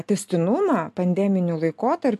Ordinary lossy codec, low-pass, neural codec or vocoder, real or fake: MP3, 96 kbps; 14.4 kHz; none; real